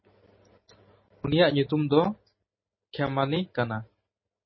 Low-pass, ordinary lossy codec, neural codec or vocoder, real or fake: 7.2 kHz; MP3, 24 kbps; none; real